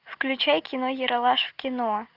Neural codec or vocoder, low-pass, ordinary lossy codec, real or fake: none; 5.4 kHz; Opus, 24 kbps; real